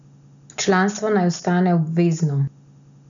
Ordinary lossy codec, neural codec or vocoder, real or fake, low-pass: none; none; real; 7.2 kHz